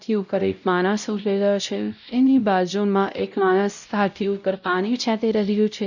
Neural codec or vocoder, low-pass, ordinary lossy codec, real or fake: codec, 16 kHz, 0.5 kbps, X-Codec, WavLM features, trained on Multilingual LibriSpeech; 7.2 kHz; none; fake